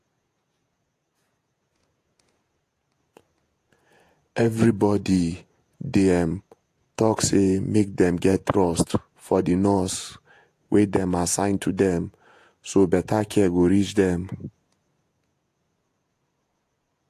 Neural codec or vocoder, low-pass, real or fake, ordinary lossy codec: vocoder, 48 kHz, 128 mel bands, Vocos; 14.4 kHz; fake; AAC, 64 kbps